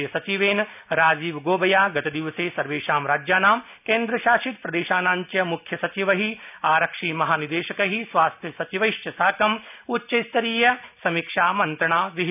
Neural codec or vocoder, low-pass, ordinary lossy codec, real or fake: none; 3.6 kHz; none; real